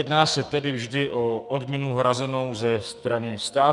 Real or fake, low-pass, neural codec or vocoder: fake; 10.8 kHz; codec, 44.1 kHz, 2.6 kbps, SNAC